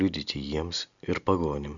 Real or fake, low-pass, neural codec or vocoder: real; 7.2 kHz; none